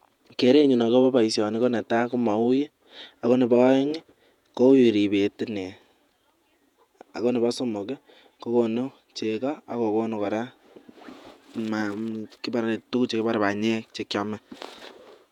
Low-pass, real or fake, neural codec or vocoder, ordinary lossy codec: 19.8 kHz; fake; vocoder, 48 kHz, 128 mel bands, Vocos; none